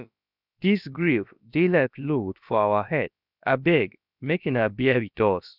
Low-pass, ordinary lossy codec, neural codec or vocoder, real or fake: 5.4 kHz; none; codec, 16 kHz, about 1 kbps, DyCAST, with the encoder's durations; fake